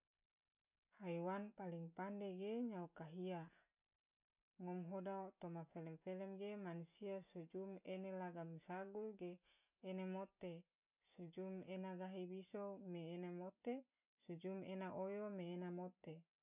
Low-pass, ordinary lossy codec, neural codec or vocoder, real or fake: 3.6 kHz; none; none; real